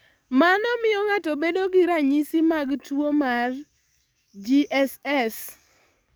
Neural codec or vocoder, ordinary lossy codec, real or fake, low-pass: codec, 44.1 kHz, 7.8 kbps, DAC; none; fake; none